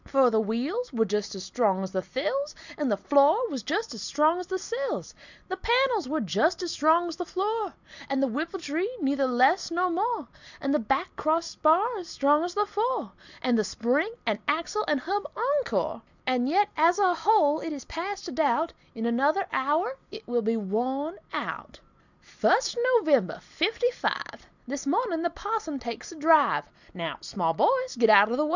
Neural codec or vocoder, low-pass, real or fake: none; 7.2 kHz; real